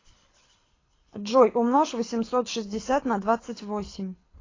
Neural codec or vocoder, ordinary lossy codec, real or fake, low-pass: codec, 24 kHz, 6 kbps, HILCodec; AAC, 32 kbps; fake; 7.2 kHz